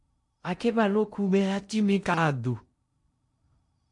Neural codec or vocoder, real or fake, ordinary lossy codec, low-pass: codec, 16 kHz in and 24 kHz out, 0.6 kbps, FocalCodec, streaming, 2048 codes; fake; MP3, 48 kbps; 10.8 kHz